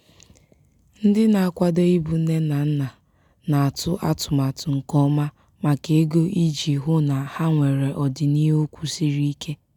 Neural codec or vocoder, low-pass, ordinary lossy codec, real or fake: none; 19.8 kHz; none; real